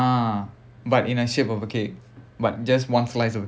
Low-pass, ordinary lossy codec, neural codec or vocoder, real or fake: none; none; none; real